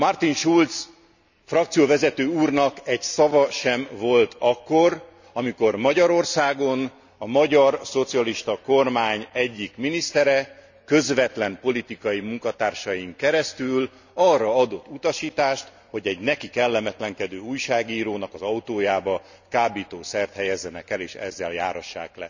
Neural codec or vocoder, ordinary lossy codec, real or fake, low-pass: none; none; real; 7.2 kHz